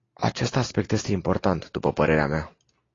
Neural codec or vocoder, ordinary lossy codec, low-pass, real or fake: none; AAC, 32 kbps; 7.2 kHz; real